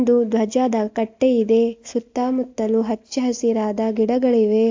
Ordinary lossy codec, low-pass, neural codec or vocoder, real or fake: AAC, 48 kbps; 7.2 kHz; none; real